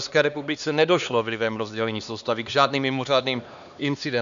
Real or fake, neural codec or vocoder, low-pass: fake; codec, 16 kHz, 2 kbps, X-Codec, HuBERT features, trained on LibriSpeech; 7.2 kHz